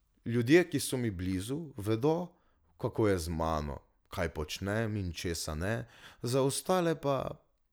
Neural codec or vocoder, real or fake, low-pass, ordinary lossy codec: none; real; none; none